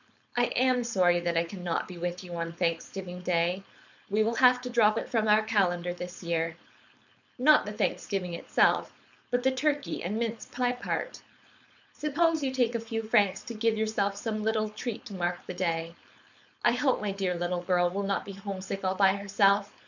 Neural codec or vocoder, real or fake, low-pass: codec, 16 kHz, 4.8 kbps, FACodec; fake; 7.2 kHz